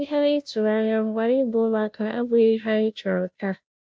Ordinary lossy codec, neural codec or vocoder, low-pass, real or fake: none; codec, 16 kHz, 0.5 kbps, FunCodec, trained on Chinese and English, 25 frames a second; none; fake